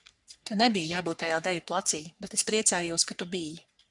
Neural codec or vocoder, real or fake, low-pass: codec, 44.1 kHz, 3.4 kbps, Pupu-Codec; fake; 10.8 kHz